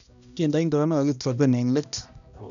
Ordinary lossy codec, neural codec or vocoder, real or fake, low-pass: none; codec, 16 kHz, 1 kbps, X-Codec, HuBERT features, trained on balanced general audio; fake; 7.2 kHz